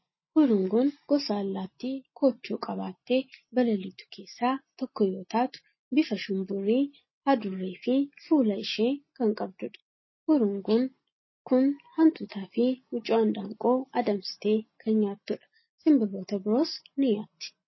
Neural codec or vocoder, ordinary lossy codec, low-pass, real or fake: none; MP3, 24 kbps; 7.2 kHz; real